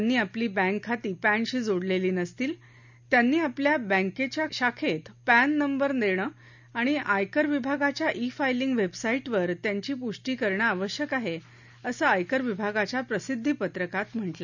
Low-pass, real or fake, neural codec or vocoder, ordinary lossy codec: 7.2 kHz; real; none; none